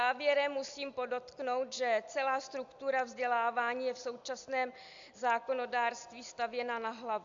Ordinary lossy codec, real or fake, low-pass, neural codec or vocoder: AAC, 64 kbps; real; 7.2 kHz; none